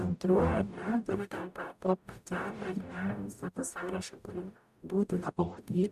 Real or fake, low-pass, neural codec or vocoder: fake; 14.4 kHz; codec, 44.1 kHz, 0.9 kbps, DAC